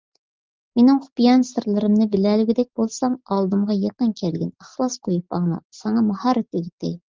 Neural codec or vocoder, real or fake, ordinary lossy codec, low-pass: none; real; Opus, 24 kbps; 7.2 kHz